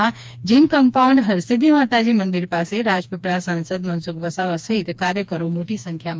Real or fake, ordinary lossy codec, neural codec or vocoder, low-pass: fake; none; codec, 16 kHz, 2 kbps, FreqCodec, smaller model; none